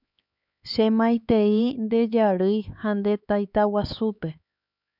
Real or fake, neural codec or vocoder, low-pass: fake; codec, 16 kHz, 4 kbps, X-Codec, HuBERT features, trained on LibriSpeech; 5.4 kHz